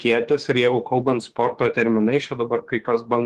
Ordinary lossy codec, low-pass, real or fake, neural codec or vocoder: Opus, 16 kbps; 14.4 kHz; fake; autoencoder, 48 kHz, 32 numbers a frame, DAC-VAE, trained on Japanese speech